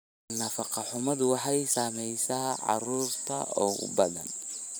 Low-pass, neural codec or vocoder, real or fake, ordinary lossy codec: none; vocoder, 44.1 kHz, 128 mel bands every 512 samples, BigVGAN v2; fake; none